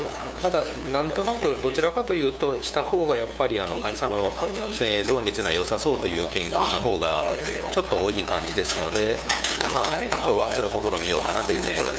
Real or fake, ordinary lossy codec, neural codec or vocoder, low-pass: fake; none; codec, 16 kHz, 2 kbps, FunCodec, trained on LibriTTS, 25 frames a second; none